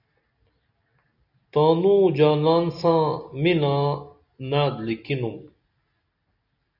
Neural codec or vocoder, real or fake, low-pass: none; real; 5.4 kHz